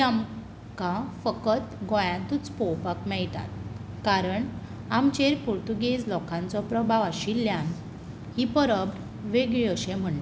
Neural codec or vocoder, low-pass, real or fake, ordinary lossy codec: none; none; real; none